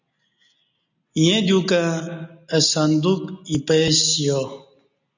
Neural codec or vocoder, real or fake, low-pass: none; real; 7.2 kHz